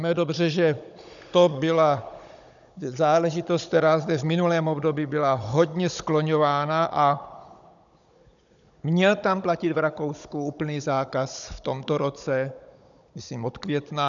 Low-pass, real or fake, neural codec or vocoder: 7.2 kHz; fake; codec, 16 kHz, 16 kbps, FunCodec, trained on Chinese and English, 50 frames a second